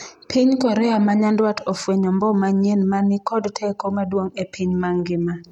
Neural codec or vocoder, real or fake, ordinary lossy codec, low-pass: none; real; none; 19.8 kHz